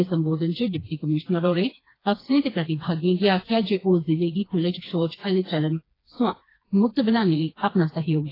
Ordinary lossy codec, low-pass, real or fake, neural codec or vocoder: AAC, 24 kbps; 5.4 kHz; fake; codec, 16 kHz, 2 kbps, FreqCodec, smaller model